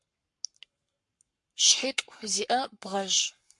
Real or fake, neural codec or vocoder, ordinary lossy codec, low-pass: fake; codec, 44.1 kHz, 7.8 kbps, Pupu-Codec; MP3, 64 kbps; 10.8 kHz